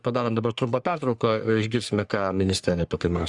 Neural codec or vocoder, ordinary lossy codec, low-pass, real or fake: codec, 44.1 kHz, 3.4 kbps, Pupu-Codec; Opus, 64 kbps; 10.8 kHz; fake